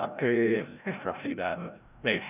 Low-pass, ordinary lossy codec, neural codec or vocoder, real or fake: 3.6 kHz; none; codec, 16 kHz, 0.5 kbps, FreqCodec, larger model; fake